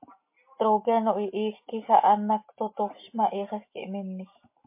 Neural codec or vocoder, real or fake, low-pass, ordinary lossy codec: none; real; 3.6 kHz; MP3, 24 kbps